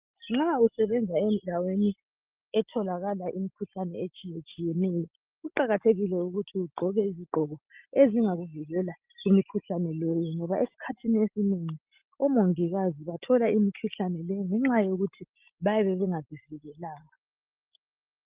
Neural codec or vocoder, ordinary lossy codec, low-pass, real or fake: none; Opus, 32 kbps; 3.6 kHz; real